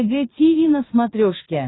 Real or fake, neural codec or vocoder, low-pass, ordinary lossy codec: fake; vocoder, 22.05 kHz, 80 mel bands, Vocos; 7.2 kHz; AAC, 16 kbps